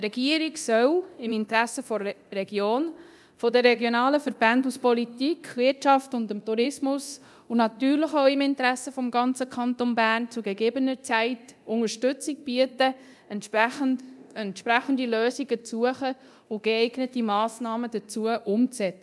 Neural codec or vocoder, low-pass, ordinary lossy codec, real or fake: codec, 24 kHz, 0.9 kbps, DualCodec; none; none; fake